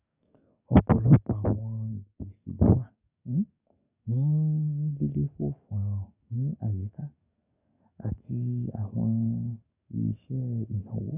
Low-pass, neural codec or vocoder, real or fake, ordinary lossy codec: 3.6 kHz; codec, 44.1 kHz, 7.8 kbps, DAC; fake; none